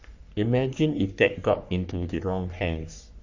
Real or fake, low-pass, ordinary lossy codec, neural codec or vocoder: fake; 7.2 kHz; Opus, 64 kbps; codec, 44.1 kHz, 3.4 kbps, Pupu-Codec